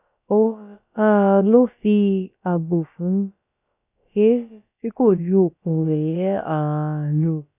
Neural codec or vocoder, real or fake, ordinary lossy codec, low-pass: codec, 16 kHz, about 1 kbps, DyCAST, with the encoder's durations; fake; none; 3.6 kHz